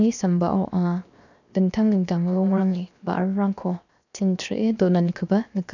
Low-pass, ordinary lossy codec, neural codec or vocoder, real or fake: 7.2 kHz; none; codec, 16 kHz, 0.7 kbps, FocalCodec; fake